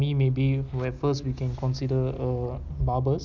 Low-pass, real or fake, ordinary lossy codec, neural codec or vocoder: 7.2 kHz; real; none; none